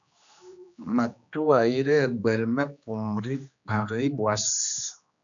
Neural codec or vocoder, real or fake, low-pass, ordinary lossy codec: codec, 16 kHz, 2 kbps, X-Codec, HuBERT features, trained on general audio; fake; 7.2 kHz; MP3, 96 kbps